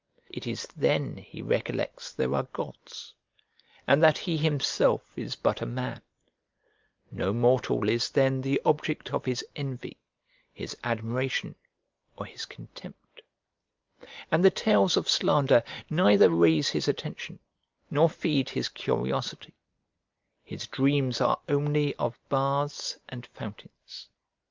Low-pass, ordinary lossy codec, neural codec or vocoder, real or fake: 7.2 kHz; Opus, 24 kbps; none; real